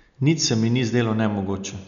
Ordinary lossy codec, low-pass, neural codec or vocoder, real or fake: none; 7.2 kHz; none; real